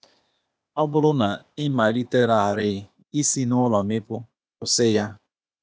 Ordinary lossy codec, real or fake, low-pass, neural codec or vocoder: none; fake; none; codec, 16 kHz, 0.8 kbps, ZipCodec